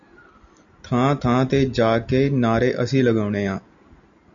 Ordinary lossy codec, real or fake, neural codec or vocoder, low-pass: MP3, 64 kbps; real; none; 7.2 kHz